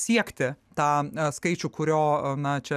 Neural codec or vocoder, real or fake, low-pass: none; real; 14.4 kHz